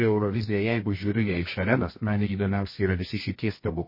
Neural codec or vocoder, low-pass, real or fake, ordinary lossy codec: codec, 24 kHz, 0.9 kbps, WavTokenizer, medium music audio release; 5.4 kHz; fake; MP3, 24 kbps